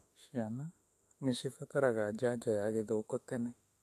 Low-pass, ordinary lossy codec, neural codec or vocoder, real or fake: 14.4 kHz; AAC, 64 kbps; autoencoder, 48 kHz, 32 numbers a frame, DAC-VAE, trained on Japanese speech; fake